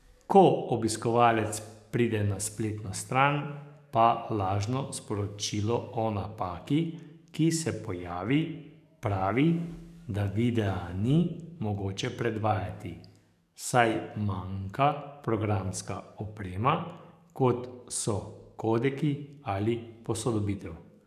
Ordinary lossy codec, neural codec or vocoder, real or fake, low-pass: none; codec, 44.1 kHz, 7.8 kbps, DAC; fake; 14.4 kHz